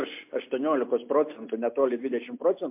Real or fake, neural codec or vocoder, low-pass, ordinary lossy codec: real; none; 3.6 kHz; MP3, 32 kbps